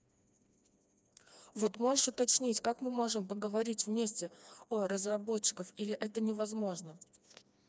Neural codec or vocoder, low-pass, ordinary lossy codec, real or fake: codec, 16 kHz, 2 kbps, FreqCodec, smaller model; none; none; fake